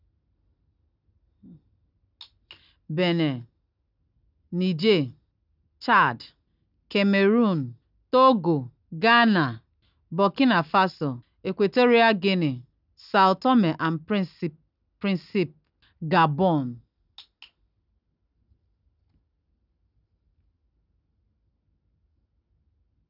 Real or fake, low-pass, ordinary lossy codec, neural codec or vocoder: real; 5.4 kHz; none; none